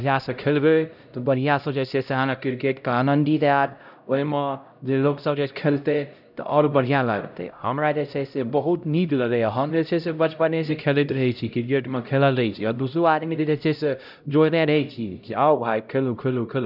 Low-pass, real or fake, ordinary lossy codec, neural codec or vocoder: 5.4 kHz; fake; none; codec, 16 kHz, 0.5 kbps, X-Codec, HuBERT features, trained on LibriSpeech